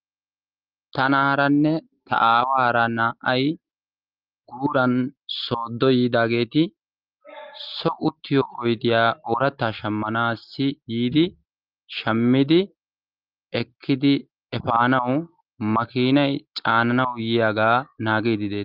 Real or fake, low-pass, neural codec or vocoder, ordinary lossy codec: real; 5.4 kHz; none; Opus, 32 kbps